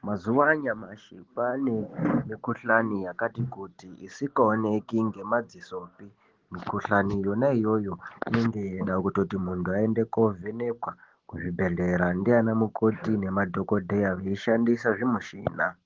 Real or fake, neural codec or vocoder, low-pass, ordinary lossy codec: real; none; 7.2 kHz; Opus, 16 kbps